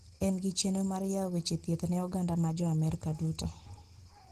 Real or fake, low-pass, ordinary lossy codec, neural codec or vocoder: real; 14.4 kHz; Opus, 16 kbps; none